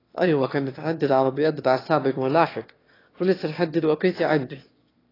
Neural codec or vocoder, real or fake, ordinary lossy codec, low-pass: autoencoder, 22.05 kHz, a latent of 192 numbers a frame, VITS, trained on one speaker; fake; AAC, 24 kbps; 5.4 kHz